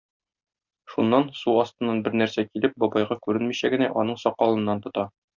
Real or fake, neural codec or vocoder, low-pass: real; none; 7.2 kHz